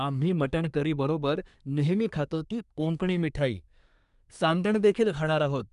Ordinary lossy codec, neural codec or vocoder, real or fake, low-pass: none; codec, 24 kHz, 1 kbps, SNAC; fake; 10.8 kHz